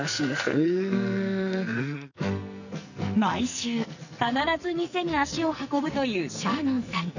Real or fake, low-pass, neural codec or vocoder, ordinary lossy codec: fake; 7.2 kHz; codec, 44.1 kHz, 2.6 kbps, SNAC; none